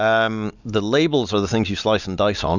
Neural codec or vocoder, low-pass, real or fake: none; 7.2 kHz; real